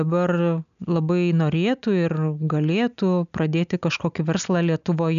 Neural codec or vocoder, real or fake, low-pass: none; real; 7.2 kHz